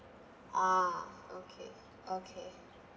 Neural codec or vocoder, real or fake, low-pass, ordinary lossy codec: none; real; none; none